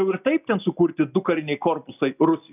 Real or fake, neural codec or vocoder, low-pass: real; none; 3.6 kHz